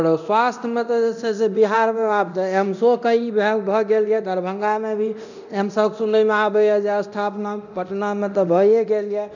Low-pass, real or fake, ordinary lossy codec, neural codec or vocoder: 7.2 kHz; fake; none; codec, 24 kHz, 0.9 kbps, DualCodec